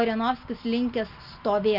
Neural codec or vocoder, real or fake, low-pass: none; real; 5.4 kHz